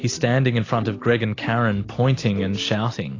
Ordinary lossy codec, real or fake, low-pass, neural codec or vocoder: AAC, 32 kbps; real; 7.2 kHz; none